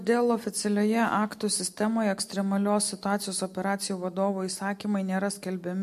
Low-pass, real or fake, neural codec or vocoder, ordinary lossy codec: 14.4 kHz; real; none; MP3, 64 kbps